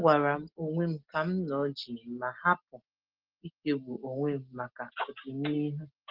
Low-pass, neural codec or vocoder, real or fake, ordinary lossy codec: 5.4 kHz; none; real; Opus, 16 kbps